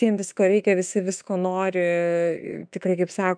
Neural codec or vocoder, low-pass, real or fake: autoencoder, 48 kHz, 32 numbers a frame, DAC-VAE, trained on Japanese speech; 9.9 kHz; fake